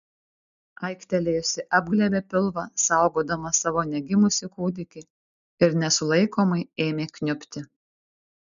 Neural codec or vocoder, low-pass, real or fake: none; 7.2 kHz; real